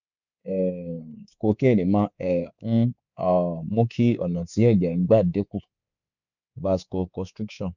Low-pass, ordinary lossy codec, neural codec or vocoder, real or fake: 7.2 kHz; none; codec, 24 kHz, 3.1 kbps, DualCodec; fake